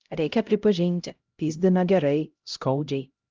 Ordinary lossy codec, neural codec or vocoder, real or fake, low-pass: Opus, 24 kbps; codec, 16 kHz, 0.5 kbps, X-Codec, HuBERT features, trained on LibriSpeech; fake; 7.2 kHz